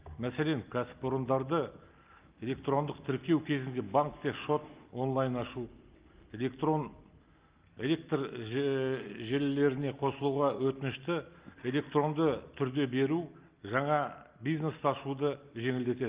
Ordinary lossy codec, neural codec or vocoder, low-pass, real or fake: Opus, 24 kbps; none; 3.6 kHz; real